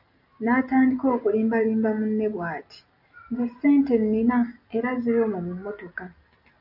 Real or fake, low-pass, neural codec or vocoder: real; 5.4 kHz; none